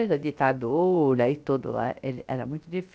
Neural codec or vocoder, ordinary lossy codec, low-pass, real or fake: codec, 16 kHz, about 1 kbps, DyCAST, with the encoder's durations; none; none; fake